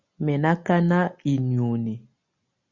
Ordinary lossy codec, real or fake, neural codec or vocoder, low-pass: Opus, 64 kbps; real; none; 7.2 kHz